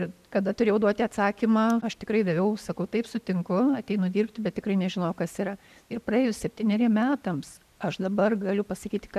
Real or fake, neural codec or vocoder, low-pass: real; none; 14.4 kHz